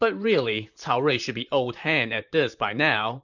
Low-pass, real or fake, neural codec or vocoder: 7.2 kHz; fake; vocoder, 44.1 kHz, 128 mel bands, Pupu-Vocoder